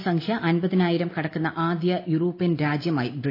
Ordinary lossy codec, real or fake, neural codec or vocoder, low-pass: AAC, 32 kbps; real; none; 5.4 kHz